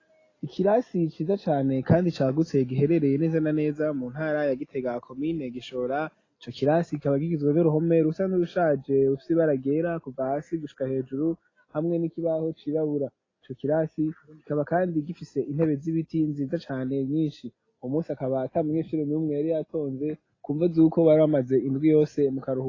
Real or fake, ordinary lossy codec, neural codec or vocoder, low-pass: real; AAC, 32 kbps; none; 7.2 kHz